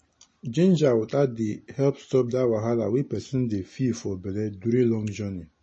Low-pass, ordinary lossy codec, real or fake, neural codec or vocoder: 9.9 kHz; MP3, 32 kbps; real; none